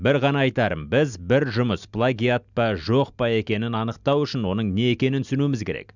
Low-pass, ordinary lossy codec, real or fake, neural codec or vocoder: 7.2 kHz; none; real; none